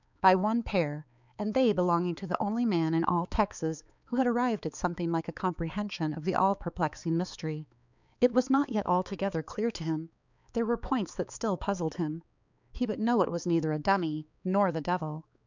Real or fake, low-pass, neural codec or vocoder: fake; 7.2 kHz; codec, 16 kHz, 4 kbps, X-Codec, HuBERT features, trained on balanced general audio